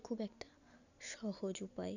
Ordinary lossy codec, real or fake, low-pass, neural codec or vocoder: none; real; 7.2 kHz; none